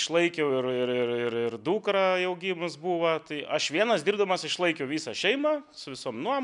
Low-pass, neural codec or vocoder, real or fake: 10.8 kHz; none; real